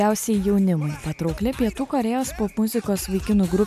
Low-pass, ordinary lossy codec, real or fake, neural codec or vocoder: 14.4 kHz; MP3, 96 kbps; real; none